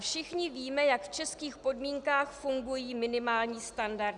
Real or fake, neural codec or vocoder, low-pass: real; none; 10.8 kHz